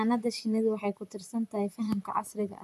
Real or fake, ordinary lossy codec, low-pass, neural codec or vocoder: fake; none; 14.4 kHz; vocoder, 44.1 kHz, 128 mel bands, Pupu-Vocoder